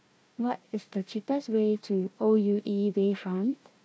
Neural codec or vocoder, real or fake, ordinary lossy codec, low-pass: codec, 16 kHz, 1 kbps, FunCodec, trained on Chinese and English, 50 frames a second; fake; none; none